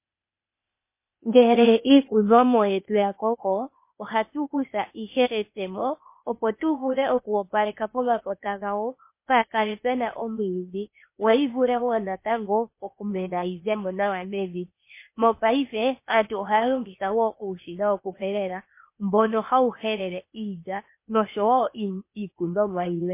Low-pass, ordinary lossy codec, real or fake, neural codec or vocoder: 3.6 kHz; MP3, 24 kbps; fake; codec, 16 kHz, 0.8 kbps, ZipCodec